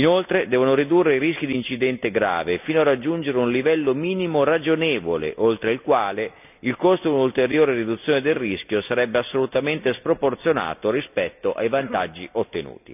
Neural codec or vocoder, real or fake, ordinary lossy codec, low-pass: none; real; none; 3.6 kHz